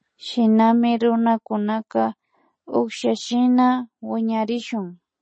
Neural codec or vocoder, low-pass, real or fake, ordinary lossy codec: none; 9.9 kHz; real; MP3, 32 kbps